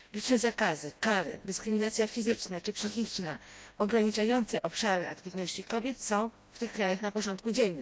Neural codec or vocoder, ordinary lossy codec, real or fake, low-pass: codec, 16 kHz, 1 kbps, FreqCodec, smaller model; none; fake; none